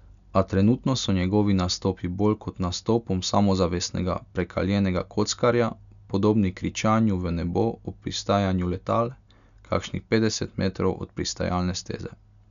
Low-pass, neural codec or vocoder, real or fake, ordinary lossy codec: 7.2 kHz; none; real; none